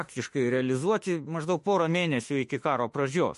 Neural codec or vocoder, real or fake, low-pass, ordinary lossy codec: autoencoder, 48 kHz, 32 numbers a frame, DAC-VAE, trained on Japanese speech; fake; 14.4 kHz; MP3, 48 kbps